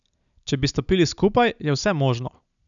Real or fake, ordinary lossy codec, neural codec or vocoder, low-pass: real; none; none; 7.2 kHz